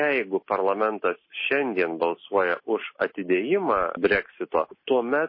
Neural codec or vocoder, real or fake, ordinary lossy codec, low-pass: none; real; MP3, 24 kbps; 5.4 kHz